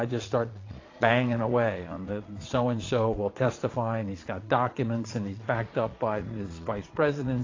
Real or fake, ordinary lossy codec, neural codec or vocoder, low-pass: fake; AAC, 32 kbps; vocoder, 22.05 kHz, 80 mel bands, Vocos; 7.2 kHz